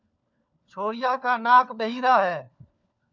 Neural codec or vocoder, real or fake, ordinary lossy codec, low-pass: codec, 16 kHz, 4 kbps, FunCodec, trained on LibriTTS, 50 frames a second; fake; MP3, 64 kbps; 7.2 kHz